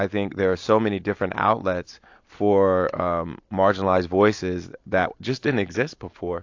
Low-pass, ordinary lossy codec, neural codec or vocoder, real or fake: 7.2 kHz; AAC, 48 kbps; none; real